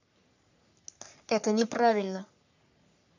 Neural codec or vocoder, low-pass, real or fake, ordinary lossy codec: codec, 44.1 kHz, 3.4 kbps, Pupu-Codec; 7.2 kHz; fake; none